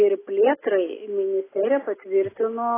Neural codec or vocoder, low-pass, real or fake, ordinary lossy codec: none; 3.6 kHz; real; AAC, 16 kbps